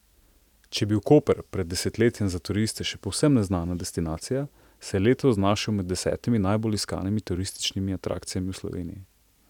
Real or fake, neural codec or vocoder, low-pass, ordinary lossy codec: real; none; 19.8 kHz; none